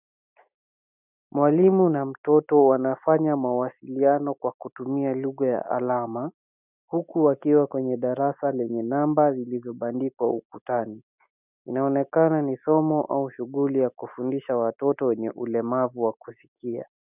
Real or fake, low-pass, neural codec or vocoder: real; 3.6 kHz; none